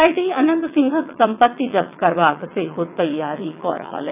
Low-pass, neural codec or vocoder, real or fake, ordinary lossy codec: 3.6 kHz; vocoder, 22.05 kHz, 80 mel bands, WaveNeXt; fake; none